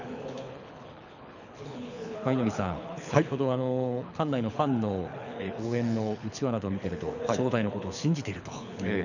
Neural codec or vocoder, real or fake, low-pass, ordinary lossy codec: codec, 24 kHz, 6 kbps, HILCodec; fake; 7.2 kHz; none